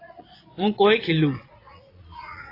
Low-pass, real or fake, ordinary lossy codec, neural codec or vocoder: 5.4 kHz; fake; AAC, 24 kbps; vocoder, 22.05 kHz, 80 mel bands, Vocos